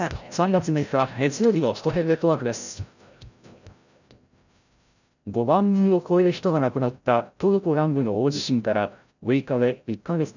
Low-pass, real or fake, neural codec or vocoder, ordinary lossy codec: 7.2 kHz; fake; codec, 16 kHz, 0.5 kbps, FreqCodec, larger model; none